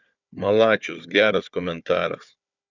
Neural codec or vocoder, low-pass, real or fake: codec, 16 kHz, 4 kbps, FunCodec, trained on Chinese and English, 50 frames a second; 7.2 kHz; fake